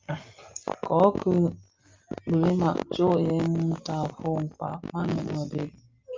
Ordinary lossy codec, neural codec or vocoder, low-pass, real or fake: Opus, 24 kbps; none; 7.2 kHz; real